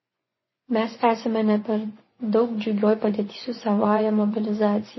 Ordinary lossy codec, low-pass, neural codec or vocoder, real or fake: MP3, 24 kbps; 7.2 kHz; vocoder, 24 kHz, 100 mel bands, Vocos; fake